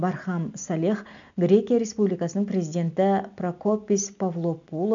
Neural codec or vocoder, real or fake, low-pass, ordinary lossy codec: none; real; 7.2 kHz; none